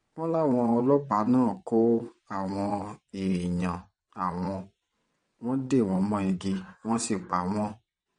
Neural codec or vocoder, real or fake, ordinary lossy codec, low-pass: vocoder, 22.05 kHz, 80 mel bands, WaveNeXt; fake; MP3, 48 kbps; 9.9 kHz